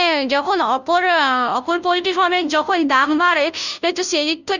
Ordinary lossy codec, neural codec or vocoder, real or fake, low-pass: none; codec, 16 kHz, 0.5 kbps, FunCodec, trained on Chinese and English, 25 frames a second; fake; 7.2 kHz